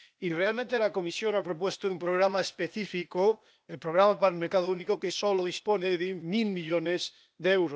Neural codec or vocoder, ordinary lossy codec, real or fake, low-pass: codec, 16 kHz, 0.8 kbps, ZipCodec; none; fake; none